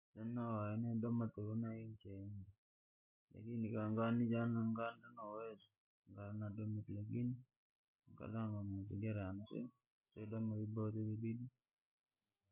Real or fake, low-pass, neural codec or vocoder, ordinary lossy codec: real; 3.6 kHz; none; MP3, 32 kbps